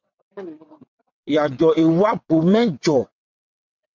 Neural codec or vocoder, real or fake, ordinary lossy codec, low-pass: vocoder, 22.05 kHz, 80 mel bands, WaveNeXt; fake; MP3, 64 kbps; 7.2 kHz